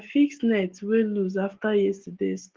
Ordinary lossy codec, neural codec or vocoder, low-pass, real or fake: Opus, 16 kbps; none; 7.2 kHz; real